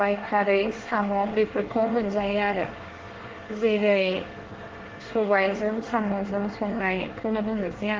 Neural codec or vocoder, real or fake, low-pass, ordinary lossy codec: codec, 24 kHz, 1 kbps, SNAC; fake; 7.2 kHz; Opus, 16 kbps